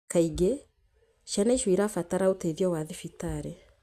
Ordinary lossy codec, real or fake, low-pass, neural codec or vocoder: Opus, 64 kbps; real; 14.4 kHz; none